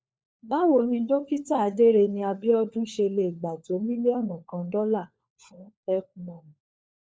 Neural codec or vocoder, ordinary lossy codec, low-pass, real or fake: codec, 16 kHz, 4 kbps, FunCodec, trained on LibriTTS, 50 frames a second; none; none; fake